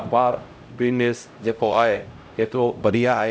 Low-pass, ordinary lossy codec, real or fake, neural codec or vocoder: none; none; fake; codec, 16 kHz, 0.5 kbps, X-Codec, HuBERT features, trained on LibriSpeech